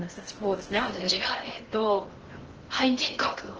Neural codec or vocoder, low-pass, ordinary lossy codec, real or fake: codec, 16 kHz in and 24 kHz out, 0.6 kbps, FocalCodec, streaming, 4096 codes; 7.2 kHz; Opus, 16 kbps; fake